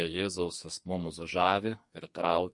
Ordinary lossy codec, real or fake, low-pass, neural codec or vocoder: MP3, 48 kbps; fake; 10.8 kHz; codec, 44.1 kHz, 2.6 kbps, SNAC